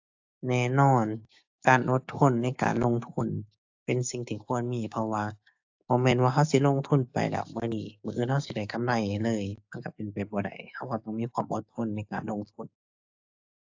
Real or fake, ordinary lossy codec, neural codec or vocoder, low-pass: fake; AAC, 48 kbps; codec, 16 kHz, 6 kbps, DAC; 7.2 kHz